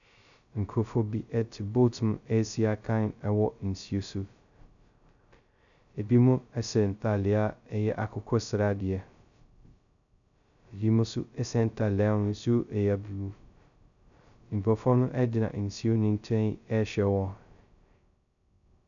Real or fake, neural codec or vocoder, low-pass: fake; codec, 16 kHz, 0.2 kbps, FocalCodec; 7.2 kHz